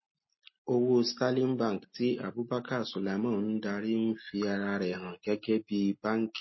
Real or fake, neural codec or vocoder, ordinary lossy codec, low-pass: real; none; MP3, 24 kbps; 7.2 kHz